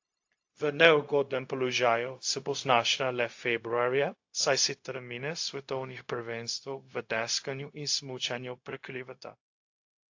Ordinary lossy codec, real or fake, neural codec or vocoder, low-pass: AAC, 48 kbps; fake; codec, 16 kHz, 0.4 kbps, LongCat-Audio-Codec; 7.2 kHz